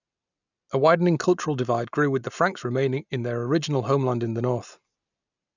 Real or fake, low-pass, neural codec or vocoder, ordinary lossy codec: real; 7.2 kHz; none; none